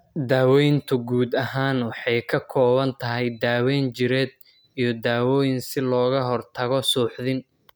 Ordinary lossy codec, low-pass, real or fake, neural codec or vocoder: none; none; real; none